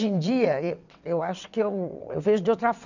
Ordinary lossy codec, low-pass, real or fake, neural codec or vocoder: none; 7.2 kHz; fake; vocoder, 22.05 kHz, 80 mel bands, WaveNeXt